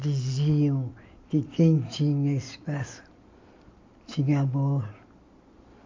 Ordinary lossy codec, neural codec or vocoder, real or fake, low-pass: MP3, 48 kbps; none; real; 7.2 kHz